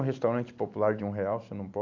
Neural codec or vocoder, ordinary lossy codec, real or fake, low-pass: none; AAC, 48 kbps; real; 7.2 kHz